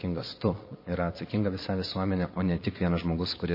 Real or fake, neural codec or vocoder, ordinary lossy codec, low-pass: fake; vocoder, 22.05 kHz, 80 mel bands, Vocos; MP3, 24 kbps; 5.4 kHz